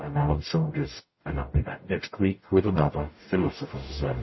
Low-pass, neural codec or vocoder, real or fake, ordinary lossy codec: 7.2 kHz; codec, 44.1 kHz, 0.9 kbps, DAC; fake; MP3, 24 kbps